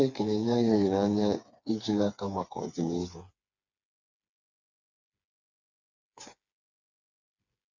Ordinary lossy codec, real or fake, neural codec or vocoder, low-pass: MP3, 64 kbps; fake; codec, 16 kHz, 4 kbps, FreqCodec, smaller model; 7.2 kHz